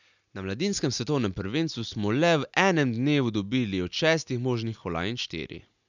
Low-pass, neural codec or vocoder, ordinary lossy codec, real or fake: 7.2 kHz; none; none; real